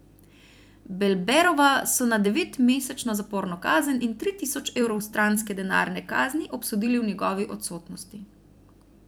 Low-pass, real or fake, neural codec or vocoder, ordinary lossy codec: none; fake; vocoder, 44.1 kHz, 128 mel bands every 256 samples, BigVGAN v2; none